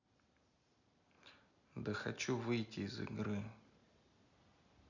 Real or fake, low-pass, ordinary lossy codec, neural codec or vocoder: real; 7.2 kHz; none; none